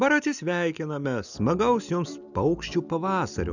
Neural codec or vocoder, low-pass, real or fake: none; 7.2 kHz; real